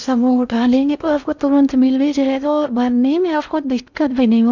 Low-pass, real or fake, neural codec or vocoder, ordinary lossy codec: 7.2 kHz; fake; codec, 16 kHz in and 24 kHz out, 0.6 kbps, FocalCodec, streaming, 2048 codes; none